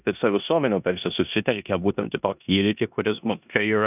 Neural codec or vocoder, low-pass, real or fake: codec, 16 kHz in and 24 kHz out, 0.9 kbps, LongCat-Audio-Codec, four codebook decoder; 3.6 kHz; fake